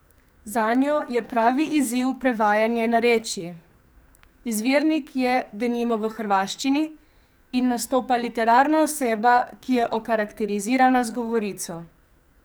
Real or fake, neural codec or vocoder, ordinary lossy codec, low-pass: fake; codec, 44.1 kHz, 2.6 kbps, SNAC; none; none